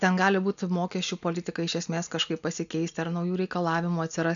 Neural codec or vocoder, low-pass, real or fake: none; 7.2 kHz; real